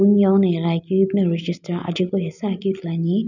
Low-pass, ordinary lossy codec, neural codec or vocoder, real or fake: 7.2 kHz; none; none; real